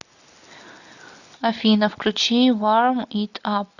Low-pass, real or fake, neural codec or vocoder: 7.2 kHz; real; none